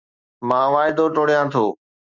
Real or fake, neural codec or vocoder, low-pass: real; none; 7.2 kHz